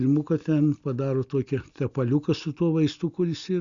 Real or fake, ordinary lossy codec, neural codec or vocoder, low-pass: real; Opus, 64 kbps; none; 7.2 kHz